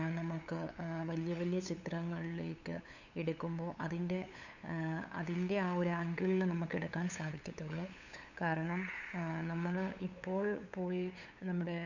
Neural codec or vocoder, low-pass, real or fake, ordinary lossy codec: codec, 16 kHz, 8 kbps, FunCodec, trained on LibriTTS, 25 frames a second; 7.2 kHz; fake; none